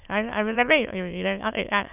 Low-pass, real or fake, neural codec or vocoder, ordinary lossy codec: 3.6 kHz; fake; autoencoder, 22.05 kHz, a latent of 192 numbers a frame, VITS, trained on many speakers; none